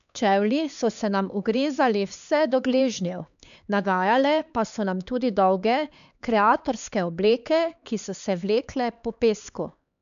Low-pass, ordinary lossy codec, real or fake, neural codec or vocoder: 7.2 kHz; none; fake; codec, 16 kHz, 4 kbps, X-Codec, HuBERT features, trained on LibriSpeech